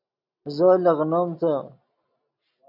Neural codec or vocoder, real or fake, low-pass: none; real; 5.4 kHz